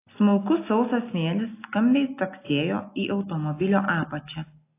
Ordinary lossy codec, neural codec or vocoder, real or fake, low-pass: AAC, 24 kbps; none; real; 3.6 kHz